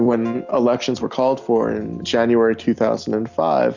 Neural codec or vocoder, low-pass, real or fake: none; 7.2 kHz; real